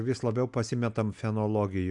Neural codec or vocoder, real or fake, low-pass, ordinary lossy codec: none; real; 10.8 kHz; MP3, 96 kbps